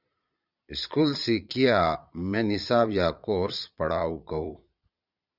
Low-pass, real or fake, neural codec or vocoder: 5.4 kHz; fake; vocoder, 22.05 kHz, 80 mel bands, Vocos